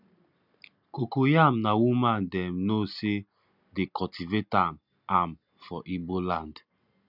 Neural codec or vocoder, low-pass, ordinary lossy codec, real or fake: none; 5.4 kHz; none; real